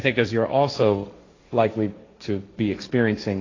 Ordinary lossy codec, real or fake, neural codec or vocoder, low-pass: AAC, 32 kbps; fake; codec, 16 kHz, 1.1 kbps, Voila-Tokenizer; 7.2 kHz